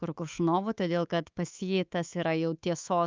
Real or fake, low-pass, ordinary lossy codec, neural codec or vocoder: fake; 7.2 kHz; Opus, 24 kbps; codec, 24 kHz, 3.1 kbps, DualCodec